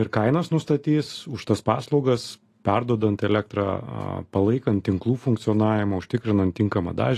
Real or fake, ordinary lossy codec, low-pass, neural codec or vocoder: real; AAC, 48 kbps; 14.4 kHz; none